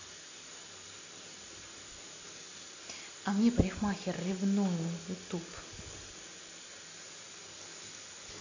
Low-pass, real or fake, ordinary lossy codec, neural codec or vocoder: 7.2 kHz; real; AAC, 48 kbps; none